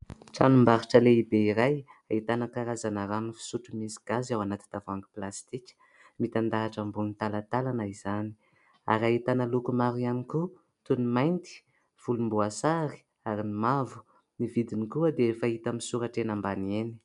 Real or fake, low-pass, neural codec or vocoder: real; 10.8 kHz; none